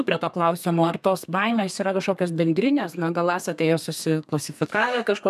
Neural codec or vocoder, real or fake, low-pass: codec, 32 kHz, 1.9 kbps, SNAC; fake; 14.4 kHz